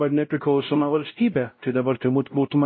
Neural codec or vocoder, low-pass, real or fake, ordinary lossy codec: codec, 16 kHz, 0.5 kbps, X-Codec, WavLM features, trained on Multilingual LibriSpeech; 7.2 kHz; fake; MP3, 24 kbps